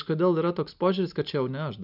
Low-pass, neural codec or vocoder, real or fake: 5.4 kHz; none; real